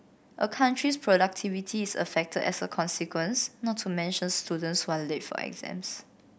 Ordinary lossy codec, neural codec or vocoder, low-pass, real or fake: none; none; none; real